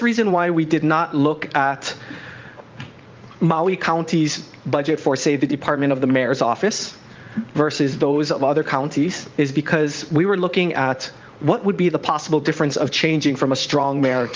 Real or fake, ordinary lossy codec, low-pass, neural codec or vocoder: fake; Opus, 32 kbps; 7.2 kHz; vocoder, 44.1 kHz, 80 mel bands, Vocos